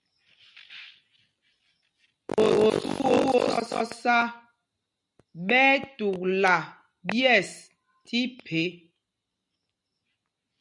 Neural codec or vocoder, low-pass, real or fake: none; 10.8 kHz; real